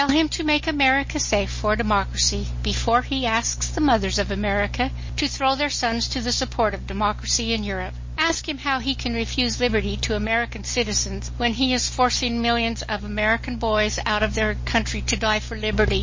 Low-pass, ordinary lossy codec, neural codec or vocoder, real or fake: 7.2 kHz; MP3, 32 kbps; none; real